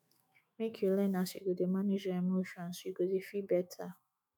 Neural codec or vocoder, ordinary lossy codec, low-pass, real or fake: autoencoder, 48 kHz, 128 numbers a frame, DAC-VAE, trained on Japanese speech; none; none; fake